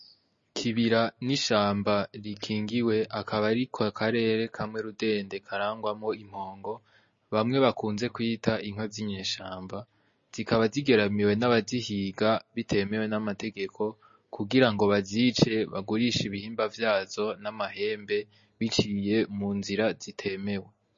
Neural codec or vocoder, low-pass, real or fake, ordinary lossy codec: none; 7.2 kHz; real; MP3, 32 kbps